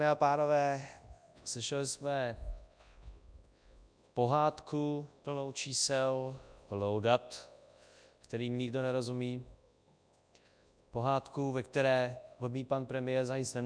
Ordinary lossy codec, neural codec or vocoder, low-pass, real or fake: MP3, 96 kbps; codec, 24 kHz, 0.9 kbps, WavTokenizer, large speech release; 9.9 kHz; fake